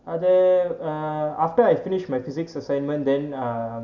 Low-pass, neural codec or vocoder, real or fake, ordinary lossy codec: 7.2 kHz; none; real; MP3, 64 kbps